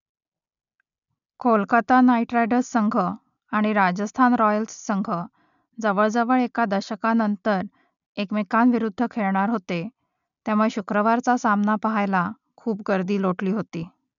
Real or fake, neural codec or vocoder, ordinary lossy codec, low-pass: real; none; none; 7.2 kHz